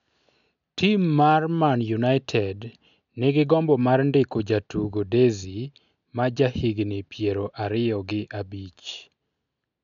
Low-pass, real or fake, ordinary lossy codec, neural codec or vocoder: 7.2 kHz; real; none; none